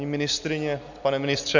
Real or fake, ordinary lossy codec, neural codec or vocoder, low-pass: real; MP3, 64 kbps; none; 7.2 kHz